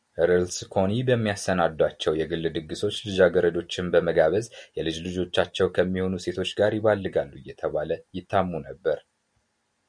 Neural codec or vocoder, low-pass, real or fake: none; 9.9 kHz; real